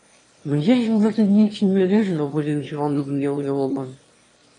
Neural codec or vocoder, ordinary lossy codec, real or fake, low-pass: autoencoder, 22.05 kHz, a latent of 192 numbers a frame, VITS, trained on one speaker; AAC, 32 kbps; fake; 9.9 kHz